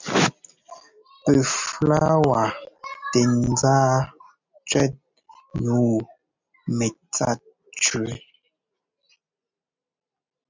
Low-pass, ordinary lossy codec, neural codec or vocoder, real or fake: 7.2 kHz; MP3, 64 kbps; none; real